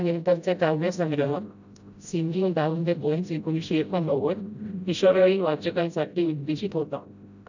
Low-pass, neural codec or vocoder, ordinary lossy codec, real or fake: 7.2 kHz; codec, 16 kHz, 0.5 kbps, FreqCodec, smaller model; none; fake